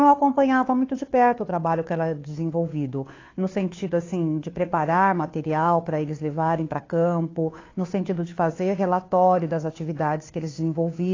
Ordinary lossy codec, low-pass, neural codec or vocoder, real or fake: AAC, 32 kbps; 7.2 kHz; codec, 16 kHz, 2 kbps, FunCodec, trained on Chinese and English, 25 frames a second; fake